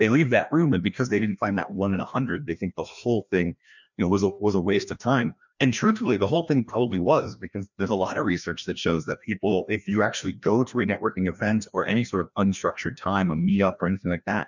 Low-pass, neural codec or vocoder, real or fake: 7.2 kHz; codec, 16 kHz, 1 kbps, FreqCodec, larger model; fake